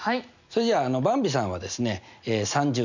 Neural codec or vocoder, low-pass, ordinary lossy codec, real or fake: none; 7.2 kHz; none; real